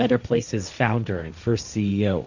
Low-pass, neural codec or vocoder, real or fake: 7.2 kHz; codec, 16 kHz, 1.1 kbps, Voila-Tokenizer; fake